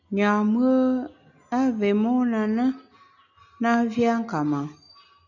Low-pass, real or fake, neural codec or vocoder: 7.2 kHz; real; none